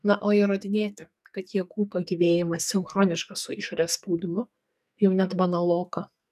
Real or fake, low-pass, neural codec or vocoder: fake; 14.4 kHz; codec, 44.1 kHz, 2.6 kbps, SNAC